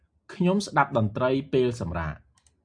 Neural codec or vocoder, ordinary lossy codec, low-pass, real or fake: none; AAC, 64 kbps; 9.9 kHz; real